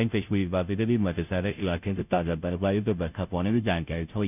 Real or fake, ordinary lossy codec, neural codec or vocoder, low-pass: fake; none; codec, 16 kHz, 0.5 kbps, FunCodec, trained on Chinese and English, 25 frames a second; 3.6 kHz